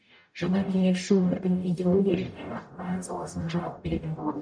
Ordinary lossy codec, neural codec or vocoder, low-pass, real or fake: none; codec, 44.1 kHz, 0.9 kbps, DAC; 9.9 kHz; fake